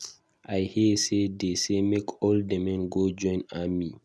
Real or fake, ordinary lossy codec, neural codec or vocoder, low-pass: real; none; none; none